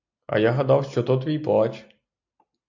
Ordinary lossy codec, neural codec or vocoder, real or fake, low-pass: AAC, 48 kbps; none; real; 7.2 kHz